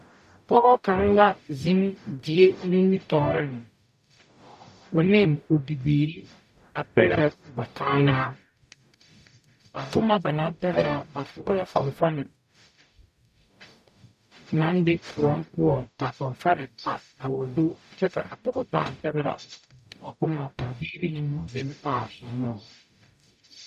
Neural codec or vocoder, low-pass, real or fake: codec, 44.1 kHz, 0.9 kbps, DAC; 14.4 kHz; fake